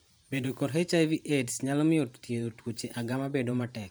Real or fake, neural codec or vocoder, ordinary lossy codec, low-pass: fake; vocoder, 44.1 kHz, 128 mel bands every 512 samples, BigVGAN v2; none; none